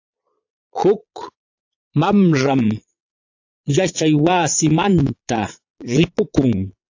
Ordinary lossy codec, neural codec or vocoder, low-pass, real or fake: AAC, 48 kbps; vocoder, 22.05 kHz, 80 mel bands, Vocos; 7.2 kHz; fake